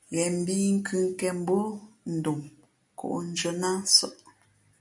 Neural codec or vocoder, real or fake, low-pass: none; real; 10.8 kHz